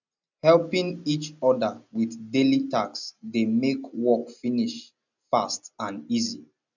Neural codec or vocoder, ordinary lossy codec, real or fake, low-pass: none; none; real; 7.2 kHz